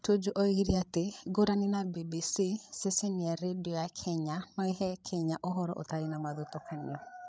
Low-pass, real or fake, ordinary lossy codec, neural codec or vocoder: none; fake; none; codec, 16 kHz, 16 kbps, FreqCodec, larger model